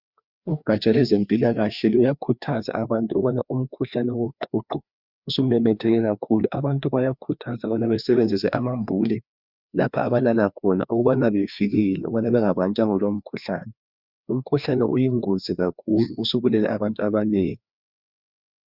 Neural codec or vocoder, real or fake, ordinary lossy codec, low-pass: codec, 16 kHz, 2 kbps, FreqCodec, larger model; fake; Opus, 64 kbps; 5.4 kHz